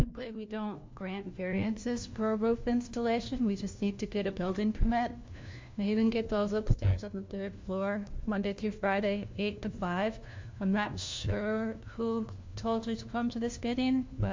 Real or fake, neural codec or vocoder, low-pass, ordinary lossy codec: fake; codec, 16 kHz, 1 kbps, FunCodec, trained on LibriTTS, 50 frames a second; 7.2 kHz; MP3, 48 kbps